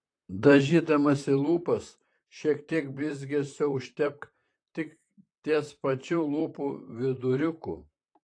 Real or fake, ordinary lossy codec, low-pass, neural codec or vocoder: fake; AAC, 48 kbps; 9.9 kHz; vocoder, 44.1 kHz, 128 mel bands, Pupu-Vocoder